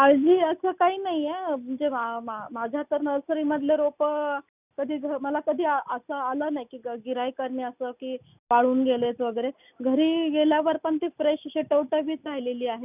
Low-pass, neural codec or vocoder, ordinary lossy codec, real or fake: 3.6 kHz; none; none; real